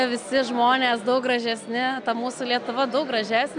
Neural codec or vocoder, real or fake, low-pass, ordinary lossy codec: none; real; 9.9 kHz; MP3, 96 kbps